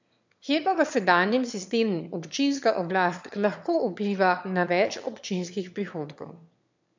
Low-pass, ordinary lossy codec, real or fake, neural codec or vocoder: 7.2 kHz; MP3, 64 kbps; fake; autoencoder, 22.05 kHz, a latent of 192 numbers a frame, VITS, trained on one speaker